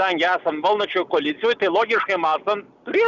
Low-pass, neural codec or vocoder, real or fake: 7.2 kHz; none; real